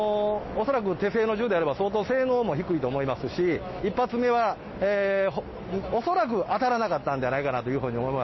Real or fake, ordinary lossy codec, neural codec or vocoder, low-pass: real; MP3, 24 kbps; none; 7.2 kHz